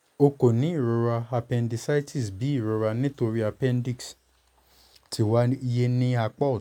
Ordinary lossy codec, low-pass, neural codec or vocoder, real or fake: none; 19.8 kHz; none; real